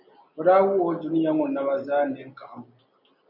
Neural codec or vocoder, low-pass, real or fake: none; 5.4 kHz; real